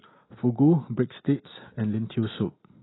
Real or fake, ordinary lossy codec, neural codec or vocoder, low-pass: real; AAC, 16 kbps; none; 7.2 kHz